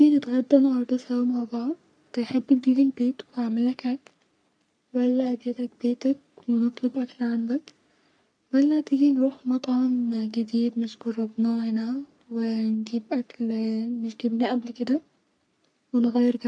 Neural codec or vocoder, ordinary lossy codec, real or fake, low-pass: codec, 44.1 kHz, 3.4 kbps, Pupu-Codec; none; fake; 9.9 kHz